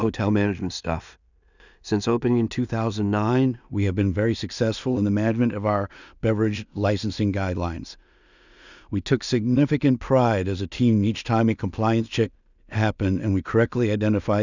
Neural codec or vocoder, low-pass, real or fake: codec, 16 kHz in and 24 kHz out, 0.4 kbps, LongCat-Audio-Codec, two codebook decoder; 7.2 kHz; fake